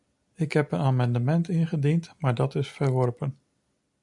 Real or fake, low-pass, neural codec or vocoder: real; 10.8 kHz; none